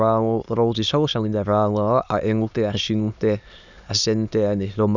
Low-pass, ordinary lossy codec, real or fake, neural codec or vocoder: 7.2 kHz; none; fake; autoencoder, 22.05 kHz, a latent of 192 numbers a frame, VITS, trained on many speakers